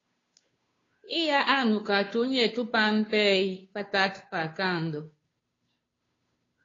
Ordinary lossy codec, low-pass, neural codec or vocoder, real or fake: AAC, 32 kbps; 7.2 kHz; codec, 16 kHz, 2 kbps, FunCodec, trained on Chinese and English, 25 frames a second; fake